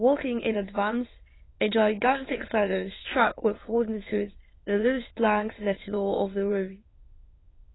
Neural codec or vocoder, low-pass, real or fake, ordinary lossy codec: autoencoder, 22.05 kHz, a latent of 192 numbers a frame, VITS, trained on many speakers; 7.2 kHz; fake; AAC, 16 kbps